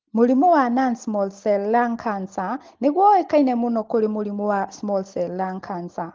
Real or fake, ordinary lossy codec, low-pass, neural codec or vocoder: real; Opus, 16 kbps; 7.2 kHz; none